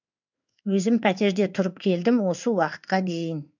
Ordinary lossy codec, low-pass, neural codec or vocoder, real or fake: none; 7.2 kHz; codec, 16 kHz in and 24 kHz out, 1 kbps, XY-Tokenizer; fake